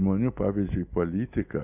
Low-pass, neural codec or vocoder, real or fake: 3.6 kHz; none; real